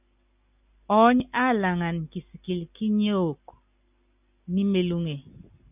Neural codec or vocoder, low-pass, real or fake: none; 3.6 kHz; real